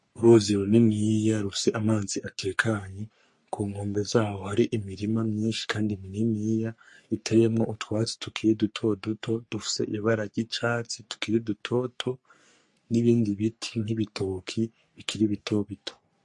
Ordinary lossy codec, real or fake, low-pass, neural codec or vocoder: MP3, 48 kbps; fake; 10.8 kHz; codec, 44.1 kHz, 3.4 kbps, Pupu-Codec